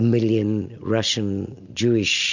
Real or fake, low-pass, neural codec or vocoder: real; 7.2 kHz; none